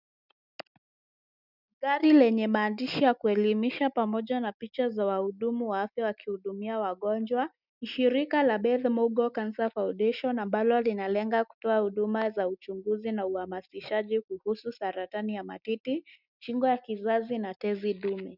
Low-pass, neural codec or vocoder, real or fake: 5.4 kHz; none; real